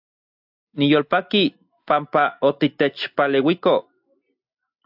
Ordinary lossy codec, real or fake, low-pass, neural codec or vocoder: AAC, 48 kbps; real; 5.4 kHz; none